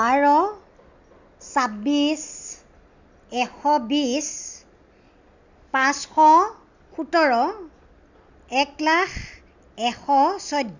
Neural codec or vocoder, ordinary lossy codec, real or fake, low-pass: none; Opus, 64 kbps; real; 7.2 kHz